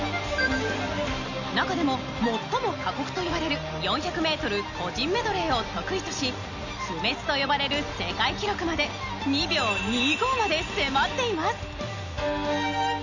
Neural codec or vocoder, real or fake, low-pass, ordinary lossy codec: none; real; 7.2 kHz; none